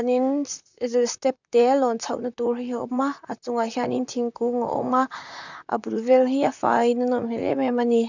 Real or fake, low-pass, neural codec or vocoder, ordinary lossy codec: fake; 7.2 kHz; vocoder, 44.1 kHz, 128 mel bands, Pupu-Vocoder; none